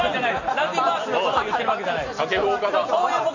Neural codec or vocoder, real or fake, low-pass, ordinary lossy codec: none; real; 7.2 kHz; none